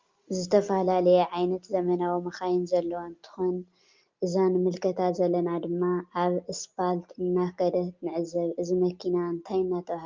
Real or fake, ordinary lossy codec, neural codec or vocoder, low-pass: real; Opus, 32 kbps; none; 7.2 kHz